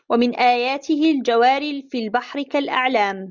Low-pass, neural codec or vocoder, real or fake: 7.2 kHz; none; real